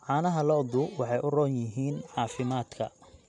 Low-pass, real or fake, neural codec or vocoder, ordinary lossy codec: 10.8 kHz; real; none; none